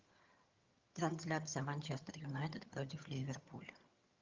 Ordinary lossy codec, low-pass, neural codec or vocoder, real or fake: Opus, 32 kbps; 7.2 kHz; vocoder, 22.05 kHz, 80 mel bands, HiFi-GAN; fake